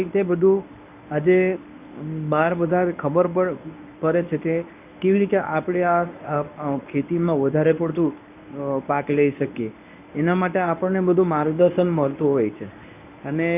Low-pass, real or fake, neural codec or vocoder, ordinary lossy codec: 3.6 kHz; fake; codec, 24 kHz, 0.9 kbps, WavTokenizer, medium speech release version 1; none